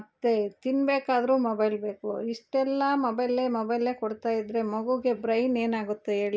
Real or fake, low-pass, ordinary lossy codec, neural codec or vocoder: real; none; none; none